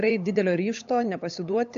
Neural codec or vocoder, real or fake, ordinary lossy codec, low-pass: codec, 16 kHz, 8 kbps, FunCodec, trained on LibriTTS, 25 frames a second; fake; MP3, 64 kbps; 7.2 kHz